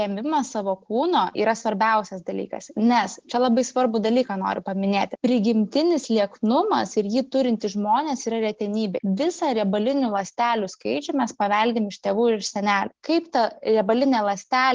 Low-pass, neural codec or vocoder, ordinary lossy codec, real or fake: 7.2 kHz; none; Opus, 16 kbps; real